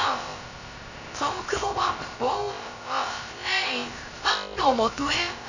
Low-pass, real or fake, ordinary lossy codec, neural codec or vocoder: 7.2 kHz; fake; none; codec, 16 kHz, about 1 kbps, DyCAST, with the encoder's durations